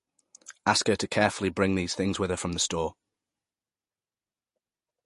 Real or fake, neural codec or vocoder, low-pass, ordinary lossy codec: fake; vocoder, 48 kHz, 128 mel bands, Vocos; 14.4 kHz; MP3, 48 kbps